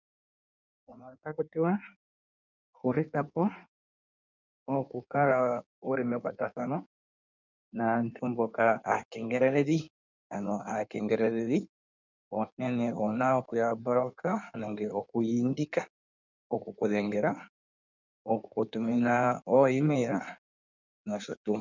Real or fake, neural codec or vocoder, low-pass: fake; codec, 16 kHz in and 24 kHz out, 1.1 kbps, FireRedTTS-2 codec; 7.2 kHz